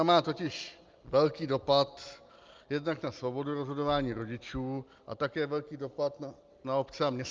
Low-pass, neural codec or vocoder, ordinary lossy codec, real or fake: 7.2 kHz; none; Opus, 24 kbps; real